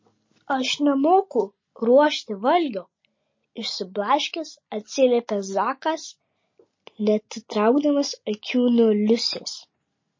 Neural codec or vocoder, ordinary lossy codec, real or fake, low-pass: none; MP3, 32 kbps; real; 7.2 kHz